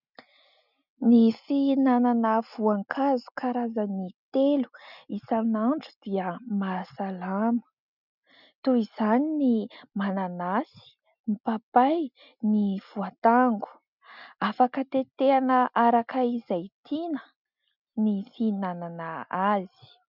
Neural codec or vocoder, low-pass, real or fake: none; 5.4 kHz; real